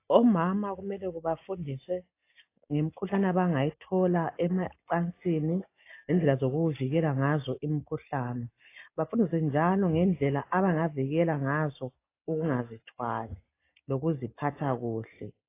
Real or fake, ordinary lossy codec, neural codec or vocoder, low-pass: real; AAC, 24 kbps; none; 3.6 kHz